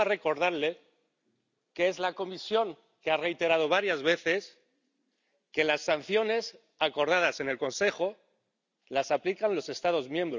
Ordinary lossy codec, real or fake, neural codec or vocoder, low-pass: none; real; none; 7.2 kHz